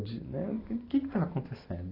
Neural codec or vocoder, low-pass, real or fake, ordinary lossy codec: none; 5.4 kHz; real; AAC, 24 kbps